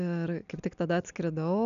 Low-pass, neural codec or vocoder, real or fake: 7.2 kHz; none; real